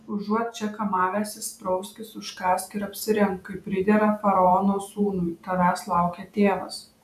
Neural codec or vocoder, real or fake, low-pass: vocoder, 48 kHz, 128 mel bands, Vocos; fake; 14.4 kHz